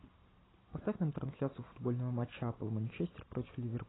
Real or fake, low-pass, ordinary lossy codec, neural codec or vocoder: real; 7.2 kHz; AAC, 16 kbps; none